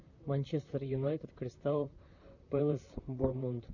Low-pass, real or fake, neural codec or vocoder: 7.2 kHz; fake; vocoder, 44.1 kHz, 128 mel bands, Pupu-Vocoder